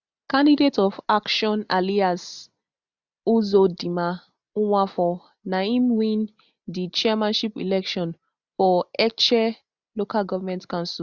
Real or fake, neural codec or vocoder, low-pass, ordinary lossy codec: real; none; 7.2 kHz; Opus, 64 kbps